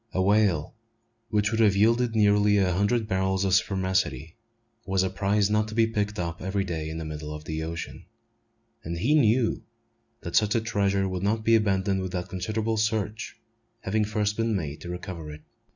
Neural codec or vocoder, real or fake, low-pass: none; real; 7.2 kHz